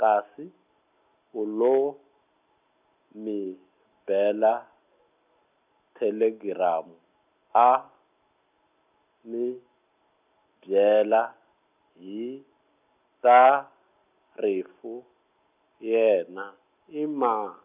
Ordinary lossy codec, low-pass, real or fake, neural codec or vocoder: none; 3.6 kHz; real; none